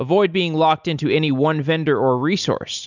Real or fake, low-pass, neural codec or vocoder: real; 7.2 kHz; none